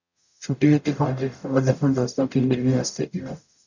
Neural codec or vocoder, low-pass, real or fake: codec, 44.1 kHz, 0.9 kbps, DAC; 7.2 kHz; fake